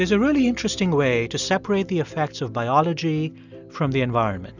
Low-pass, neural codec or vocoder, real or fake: 7.2 kHz; none; real